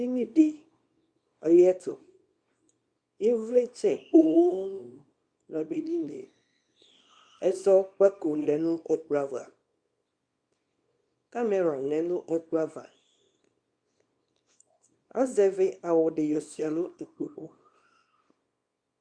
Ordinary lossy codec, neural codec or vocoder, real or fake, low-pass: Opus, 64 kbps; codec, 24 kHz, 0.9 kbps, WavTokenizer, small release; fake; 9.9 kHz